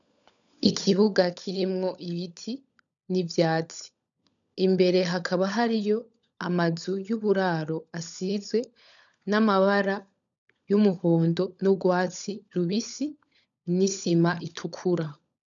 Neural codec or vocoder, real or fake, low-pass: codec, 16 kHz, 16 kbps, FunCodec, trained on LibriTTS, 50 frames a second; fake; 7.2 kHz